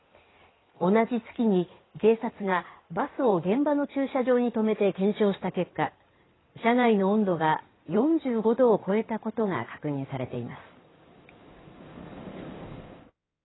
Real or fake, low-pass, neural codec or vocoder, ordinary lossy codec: fake; 7.2 kHz; codec, 16 kHz in and 24 kHz out, 2.2 kbps, FireRedTTS-2 codec; AAC, 16 kbps